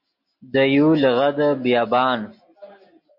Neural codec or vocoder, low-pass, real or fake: none; 5.4 kHz; real